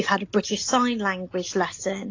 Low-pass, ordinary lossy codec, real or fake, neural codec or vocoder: 7.2 kHz; AAC, 32 kbps; real; none